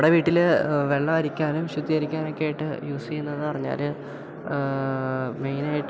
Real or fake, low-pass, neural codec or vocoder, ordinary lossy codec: real; none; none; none